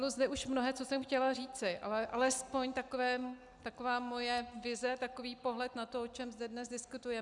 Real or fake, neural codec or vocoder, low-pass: real; none; 10.8 kHz